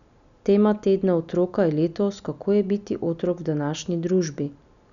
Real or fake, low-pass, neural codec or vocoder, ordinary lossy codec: real; 7.2 kHz; none; none